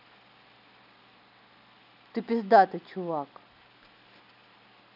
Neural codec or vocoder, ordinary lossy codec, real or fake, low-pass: none; none; real; 5.4 kHz